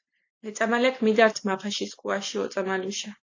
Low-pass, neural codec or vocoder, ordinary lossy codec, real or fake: 7.2 kHz; none; AAC, 48 kbps; real